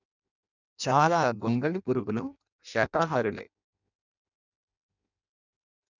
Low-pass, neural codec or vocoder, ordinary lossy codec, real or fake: 7.2 kHz; codec, 16 kHz in and 24 kHz out, 0.6 kbps, FireRedTTS-2 codec; none; fake